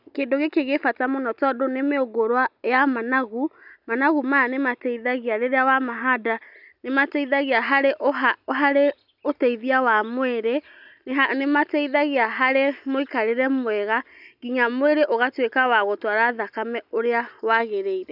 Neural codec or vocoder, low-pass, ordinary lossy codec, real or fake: none; 5.4 kHz; none; real